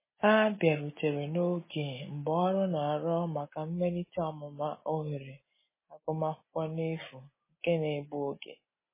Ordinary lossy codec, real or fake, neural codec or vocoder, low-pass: MP3, 16 kbps; real; none; 3.6 kHz